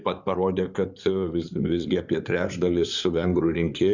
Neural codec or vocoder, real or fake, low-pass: codec, 16 kHz in and 24 kHz out, 2.2 kbps, FireRedTTS-2 codec; fake; 7.2 kHz